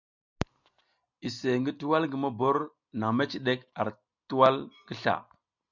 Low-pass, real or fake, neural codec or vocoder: 7.2 kHz; real; none